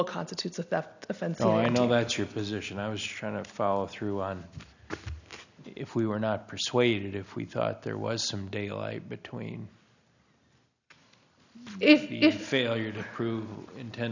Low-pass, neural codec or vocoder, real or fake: 7.2 kHz; none; real